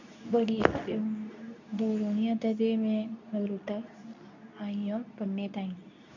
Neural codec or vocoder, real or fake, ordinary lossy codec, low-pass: codec, 24 kHz, 0.9 kbps, WavTokenizer, medium speech release version 2; fake; none; 7.2 kHz